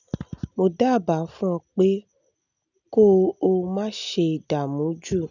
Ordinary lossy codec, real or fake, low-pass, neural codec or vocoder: none; real; 7.2 kHz; none